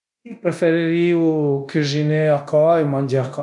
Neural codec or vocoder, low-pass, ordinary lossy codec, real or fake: codec, 24 kHz, 0.9 kbps, DualCodec; 10.8 kHz; none; fake